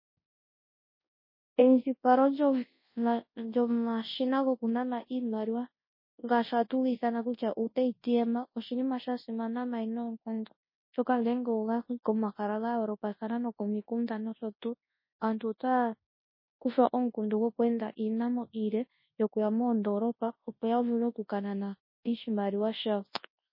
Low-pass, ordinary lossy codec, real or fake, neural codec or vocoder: 5.4 kHz; MP3, 24 kbps; fake; codec, 24 kHz, 0.9 kbps, WavTokenizer, large speech release